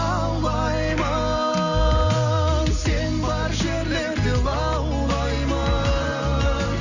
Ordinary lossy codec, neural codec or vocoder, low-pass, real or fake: none; none; 7.2 kHz; real